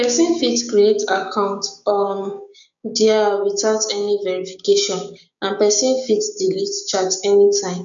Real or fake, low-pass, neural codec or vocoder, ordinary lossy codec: fake; 7.2 kHz; codec, 16 kHz, 6 kbps, DAC; none